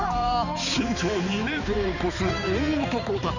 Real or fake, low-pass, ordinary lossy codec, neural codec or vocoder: fake; 7.2 kHz; none; codec, 16 kHz, 4 kbps, X-Codec, HuBERT features, trained on balanced general audio